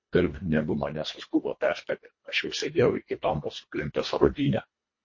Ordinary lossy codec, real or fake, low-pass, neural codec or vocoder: MP3, 32 kbps; fake; 7.2 kHz; codec, 24 kHz, 1.5 kbps, HILCodec